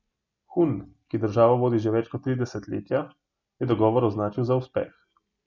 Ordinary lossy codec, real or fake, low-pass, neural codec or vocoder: none; real; 7.2 kHz; none